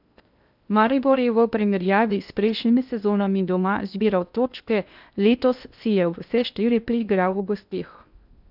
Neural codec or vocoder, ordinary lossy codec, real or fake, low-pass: codec, 16 kHz in and 24 kHz out, 0.6 kbps, FocalCodec, streaming, 2048 codes; none; fake; 5.4 kHz